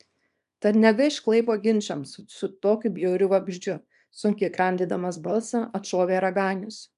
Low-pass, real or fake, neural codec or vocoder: 10.8 kHz; fake; codec, 24 kHz, 0.9 kbps, WavTokenizer, small release